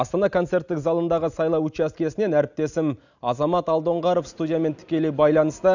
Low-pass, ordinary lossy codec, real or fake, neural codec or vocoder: 7.2 kHz; none; real; none